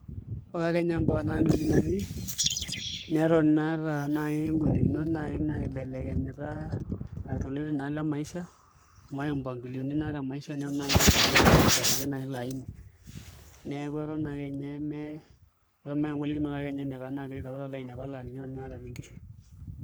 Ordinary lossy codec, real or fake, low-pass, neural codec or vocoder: none; fake; none; codec, 44.1 kHz, 3.4 kbps, Pupu-Codec